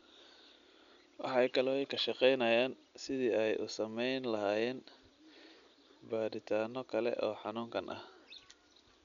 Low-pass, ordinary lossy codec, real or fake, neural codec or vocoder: 7.2 kHz; none; real; none